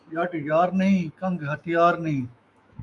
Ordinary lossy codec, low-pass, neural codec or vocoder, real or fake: MP3, 96 kbps; 10.8 kHz; autoencoder, 48 kHz, 128 numbers a frame, DAC-VAE, trained on Japanese speech; fake